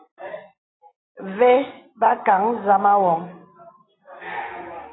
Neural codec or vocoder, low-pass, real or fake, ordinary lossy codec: none; 7.2 kHz; real; AAC, 16 kbps